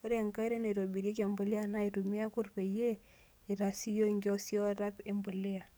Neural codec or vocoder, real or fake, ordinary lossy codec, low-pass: codec, 44.1 kHz, 7.8 kbps, DAC; fake; none; none